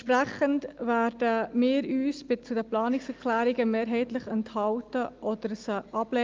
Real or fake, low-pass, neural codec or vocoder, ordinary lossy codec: real; 7.2 kHz; none; Opus, 24 kbps